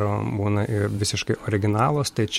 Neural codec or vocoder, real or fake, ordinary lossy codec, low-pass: vocoder, 44.1 kHz, 128 mel bands every 512 samples, BigVGAN v2; fake; MP3, 64 kbps; 19.8 kHz